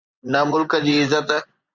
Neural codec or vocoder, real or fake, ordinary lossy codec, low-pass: vocoder, 24 kHz, 100 mel bands, Vocos; fake; Opus, 64 kbps; 7.2 kHz